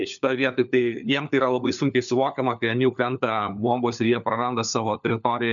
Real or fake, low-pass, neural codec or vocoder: fake; 7.2 kHz; codec, 16 kHz, 4 kbps, FunCodec, trained on LibriTTS, 50 frames a second